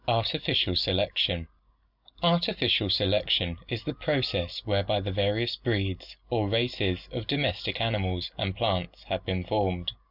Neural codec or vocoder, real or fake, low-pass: none; real; 5.4 kHz